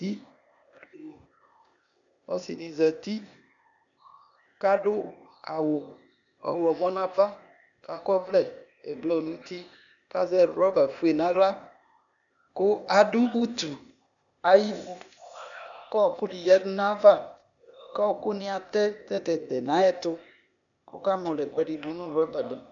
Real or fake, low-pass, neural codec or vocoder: fake; 7.2 kHz; codec, 16 kHz, 0.8 kbps, ZipCodec